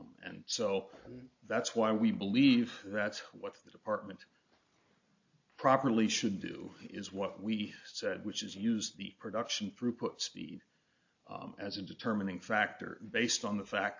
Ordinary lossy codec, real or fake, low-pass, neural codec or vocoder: AAC, 48 kbps; real; 7.2 kHz; none